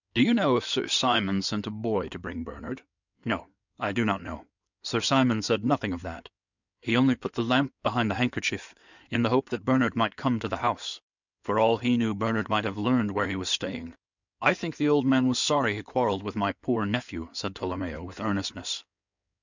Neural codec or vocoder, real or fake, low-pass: codec, 16 kHz in and 24 kHz out, 2.2 kbps, FireRedTTS-2 codec; fake; 7.2 kHz